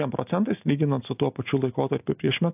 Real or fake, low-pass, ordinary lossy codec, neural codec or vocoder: real; 3.6 kHz; AAC, 32 kbps; none